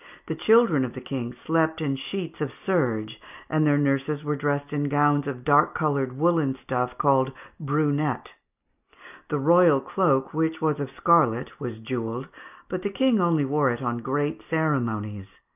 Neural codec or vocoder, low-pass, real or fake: none; 3.6 kHz; real